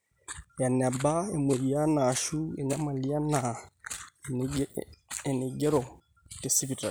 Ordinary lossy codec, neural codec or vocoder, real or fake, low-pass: none; none; real; none